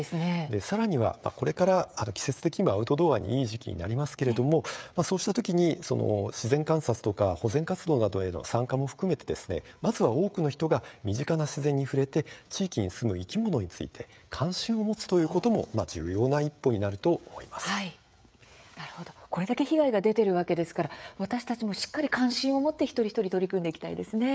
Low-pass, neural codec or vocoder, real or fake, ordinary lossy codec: none; codec, 16 kHz, 16 kbps, FreqCodec, smaller model; fake; none